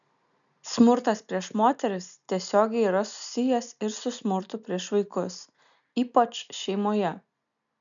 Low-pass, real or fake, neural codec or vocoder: 7.2 kHz; real; none